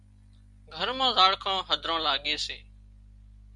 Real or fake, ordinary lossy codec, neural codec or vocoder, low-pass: real; MP3, 96 kbps; none; 10.8 kHz